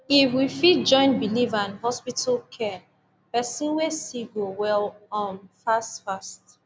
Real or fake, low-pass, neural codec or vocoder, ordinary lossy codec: real; none; none; none